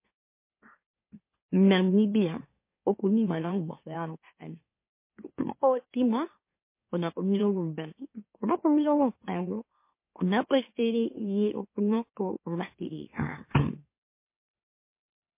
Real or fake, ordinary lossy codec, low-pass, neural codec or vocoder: fake; MP3, 24 kbps; 3.6 kHz; autoencoder, 44.1 kHz, a latent of 192 numbers a frame, MeloTTS